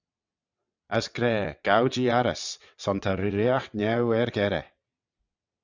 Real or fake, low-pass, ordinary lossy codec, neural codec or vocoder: fake; 7.2 kHz; Opus, 64 kbps; vocoder, 22.05 kHz, 80 mel bands, WaveNeXt